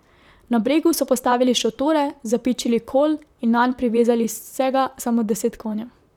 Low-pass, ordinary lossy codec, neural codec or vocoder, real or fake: 19.8 kHz; none; vocoder, 44.1 kHz, 128 mel bands, Pupu-Vocoder; fake